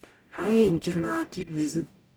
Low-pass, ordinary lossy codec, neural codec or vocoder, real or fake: none; none; codec, 44.1 kHz, 0.9 kbps, DAC; fake